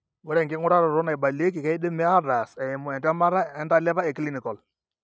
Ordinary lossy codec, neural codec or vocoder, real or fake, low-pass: none; none; real; none